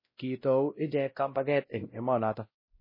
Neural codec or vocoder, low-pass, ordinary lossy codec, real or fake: codec, 16 kHz, 0.5 kbps, X-Codec, WavLM features, trained on Multilingual LibriSpeech; 5.4 kHz; MP3, 24 kbps; fake